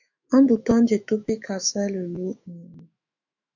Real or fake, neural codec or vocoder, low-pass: fake; autoencoder, 48 kHz, 128 numbers a frame, DAC-VAE, trained on Japanese speech; 7.2 kHz